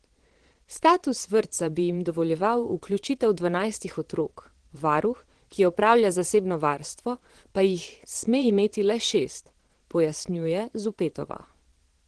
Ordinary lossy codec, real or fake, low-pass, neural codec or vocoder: Opus, 16 kbps; fake; 9.9 kHz; vocoder, 22.05 kHz, 80 mel bands, Vocos